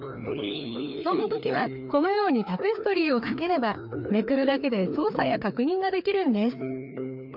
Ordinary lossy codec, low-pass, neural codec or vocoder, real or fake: none; 5.4 kHz; codec, 16 kHz, 2 kbps, FreqCodec, larger model; fake